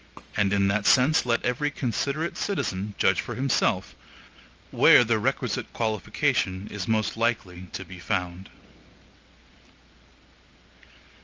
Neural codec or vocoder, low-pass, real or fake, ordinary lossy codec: none; 7.2 kHz; real; Opus, 24 kbps